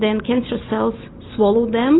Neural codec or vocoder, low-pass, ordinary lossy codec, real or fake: none; 7.2 kHz; AAC, 16 kbps; real